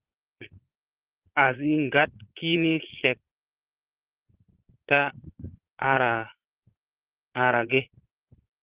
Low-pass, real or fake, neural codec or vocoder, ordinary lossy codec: 3.6 kHz; fake; vocoder, 22.05 kHz, 80 mel bands, Vocos; Opus, 16 kbps